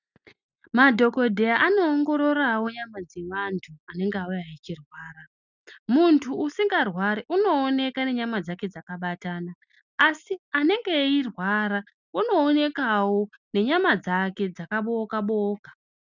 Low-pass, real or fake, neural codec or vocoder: 7.2 kHz; real; none